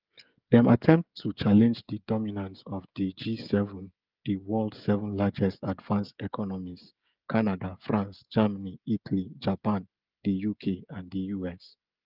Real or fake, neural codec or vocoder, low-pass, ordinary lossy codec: fake; codec, 16 kHz, 16 kbps, FreqCodec, smaller model; 5.4 kHz; Opus, 24 kbps